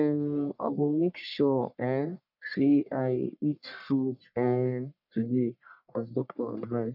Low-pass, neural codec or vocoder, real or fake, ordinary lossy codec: 5.4 kHz; codec, 44.1 kHz, 1.7 kbps, Pupu-Codec; fake; none